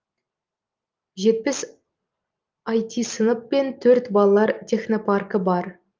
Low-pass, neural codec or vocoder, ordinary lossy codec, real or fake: 7.2 kHz; none; Opus, 32 kbps; real